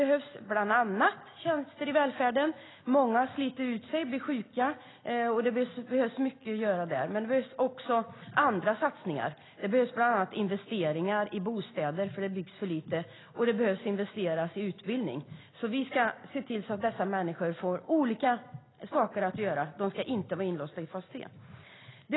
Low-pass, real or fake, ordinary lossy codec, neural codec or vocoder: 7.2 kHz; real; AAC, 16 kbps; none